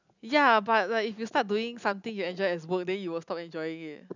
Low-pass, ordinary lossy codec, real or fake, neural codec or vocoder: 7.2 kHz; none; real; none